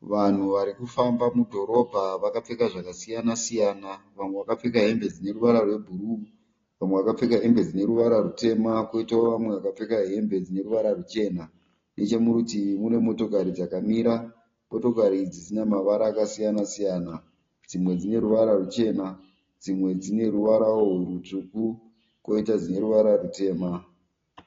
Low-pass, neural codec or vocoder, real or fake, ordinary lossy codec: 7.2 kHz; none; real; AAC, 32 kbps